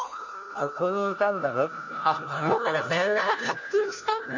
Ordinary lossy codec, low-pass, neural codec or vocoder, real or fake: none; 7.2 kHz; codec, 16 kHz, 1 kbps, FunCodec, trained on LibriTTS, 50 frames a second; fake